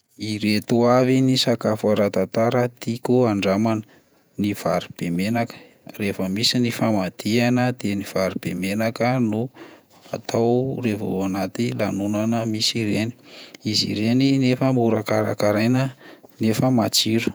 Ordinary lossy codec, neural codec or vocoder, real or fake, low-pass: none; vocoder, 48 kHz, 128 mel bands, Vocos; fake; none